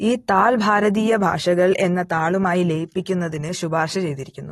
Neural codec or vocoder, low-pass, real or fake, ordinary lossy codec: none; 14.4 kHz; real; AAC, 32 kbps